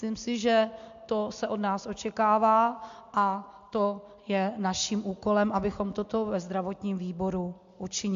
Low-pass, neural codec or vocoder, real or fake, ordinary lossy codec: 7.2 kHz; none; real; AAC, 64 kbps